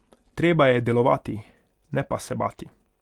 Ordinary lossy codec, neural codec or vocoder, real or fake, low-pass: Opus, 32 kbps; none; real; 19.8 kHz